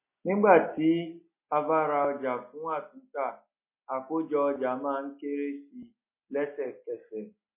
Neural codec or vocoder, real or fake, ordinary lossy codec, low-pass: none; real; MP3, 24 kbps; 3.6 kHz